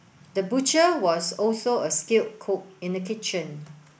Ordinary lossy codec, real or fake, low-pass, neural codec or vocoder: none; real; none; none